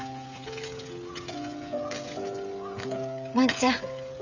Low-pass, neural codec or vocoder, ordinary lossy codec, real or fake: 7.2 kHz; codec, 16 kHz, 16 kbps, FreqCodec, smaller model; none; fake